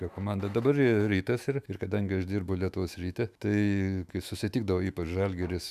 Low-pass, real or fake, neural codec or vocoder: 14.4 kHz; fake; autoencoder, 48 kHz, 128 numbers a frame, DAC-VAE, trained on Japanese speech